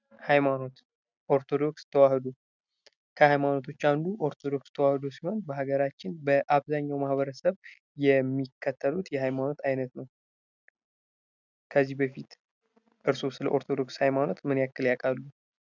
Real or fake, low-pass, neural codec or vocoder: real; 7.2 kHz; none